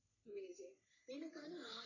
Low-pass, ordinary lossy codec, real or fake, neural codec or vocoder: 7.2 kHz; none; fake; codec, 44.1 kHz, 3.4 kbps, Pupu-Codec